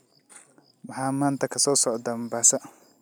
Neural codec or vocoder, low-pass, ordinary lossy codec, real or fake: none; none; none; real